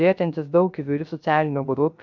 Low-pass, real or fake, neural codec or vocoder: 7.2 kHz; fake; codec, 16 kHz, 0.3 kbps, FocalCodec